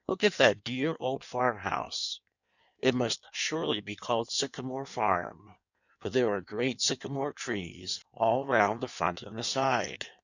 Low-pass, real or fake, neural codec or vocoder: 7.2 kHz; fake; codec, 16 kHz in and 24 kHz out, 1.1 kbps, FireRedTTS-2 codec